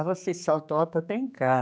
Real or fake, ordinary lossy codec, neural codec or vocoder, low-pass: fake; none; codec, 16 kHz, 4 kbps, X-Codec, HuBERT features, trained on general audio; none